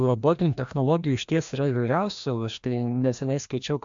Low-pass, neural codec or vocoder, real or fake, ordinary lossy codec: 7.2 kHz; codec, 16 kHz, 1 kbps, FreqCodec, larger model; fake; MP3, 64 kbps